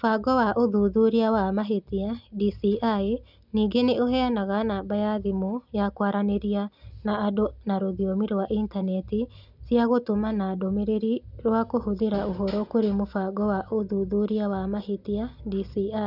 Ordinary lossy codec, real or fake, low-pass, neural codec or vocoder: none; real; 5.4 kHz; none